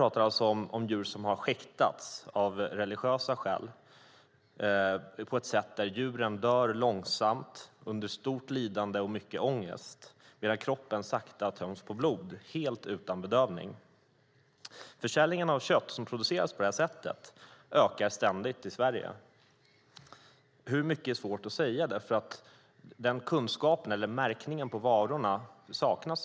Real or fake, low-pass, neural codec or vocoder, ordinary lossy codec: real; none; none; none